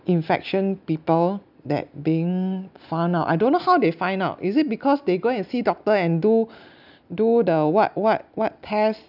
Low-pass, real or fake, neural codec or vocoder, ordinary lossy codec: 5.4 kHz; real; none; none